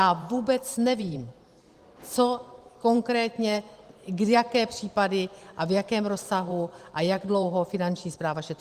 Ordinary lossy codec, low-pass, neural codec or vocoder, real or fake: Opus, 24 kbps; 14.4 kHz; vocoder, 44.1 kHz, 128 mel bands every 512 samples, BigVGAN v2; fake